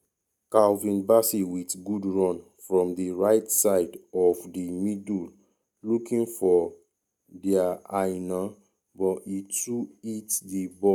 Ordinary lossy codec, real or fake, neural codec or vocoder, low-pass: none; real; none; 19.8 kHz